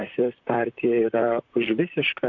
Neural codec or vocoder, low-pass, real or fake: vocoder, 44.1 kHz, 128 mel bands, Pupu-Vocoder; 7.2 kHz; fake